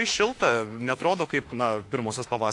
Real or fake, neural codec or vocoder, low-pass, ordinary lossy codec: fake; autoencoder, 48 kHz, 32 numbers a frame, DAC-VAE, trained on Japanese speech; 10.8 kHz; AAC, 48 kbps